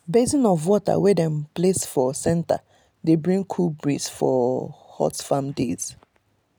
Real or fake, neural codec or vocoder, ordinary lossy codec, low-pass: real; none; none; none